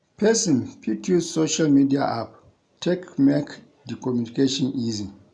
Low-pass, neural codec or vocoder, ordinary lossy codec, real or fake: 9.9 kHz; none; none; real